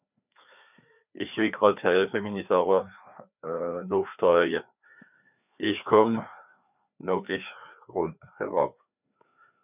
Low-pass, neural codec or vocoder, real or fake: 3.6 kHz; codec, 16 kHz, 2 kbps, FreqCodec, larger model; fake